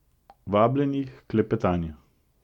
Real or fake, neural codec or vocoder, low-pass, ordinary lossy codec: real; none; 19.8 kHz; MP3, 96 kbps